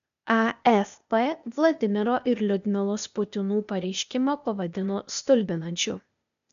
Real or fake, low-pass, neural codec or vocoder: fake; 7.2 kHz; codec, 16 kHz, 0.8 kbps, ZipCodec